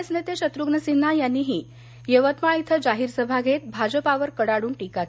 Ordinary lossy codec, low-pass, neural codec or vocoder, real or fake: none; none; none; real